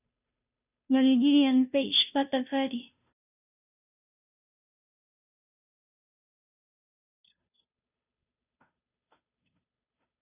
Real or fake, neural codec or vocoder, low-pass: fake; codec, 16 kHz, 0.5 kbps, FunCodec, trained on Chinese and English, 25 frames a second; 3.6 kHz